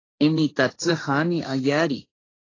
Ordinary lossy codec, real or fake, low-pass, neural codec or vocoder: AAC, 32 kbps; fake; 7.2 kHz; codec, 16 kHz, 1.1 kbps, Voila-Tokenizer